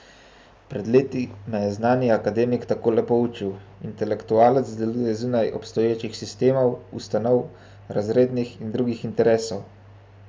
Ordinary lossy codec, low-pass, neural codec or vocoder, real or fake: none; none; none; real